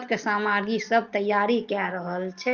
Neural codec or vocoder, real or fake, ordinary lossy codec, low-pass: none; real; Opus, 24 kbps; 7.2 kHz